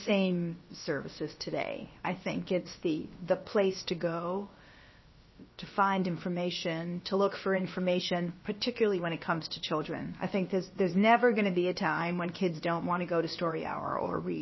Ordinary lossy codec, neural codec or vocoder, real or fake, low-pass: MP3, 24 kbps; codec, 16 kHz, about 1 kbps, DyCAST, with the encoder's durations; fake; 7.2 kHz